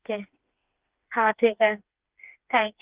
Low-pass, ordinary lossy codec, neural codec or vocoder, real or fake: 3.6 kHz; Opus, 32 kbps; codec, 16 kHz, 4 kbps, FreqCodec, smaller model; fake